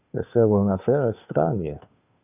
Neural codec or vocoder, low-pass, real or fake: codec, 16 kHz, 2 kbps, FunCodec, trained on Chinese and English, 25 frames a second; 3.6 kHz; fake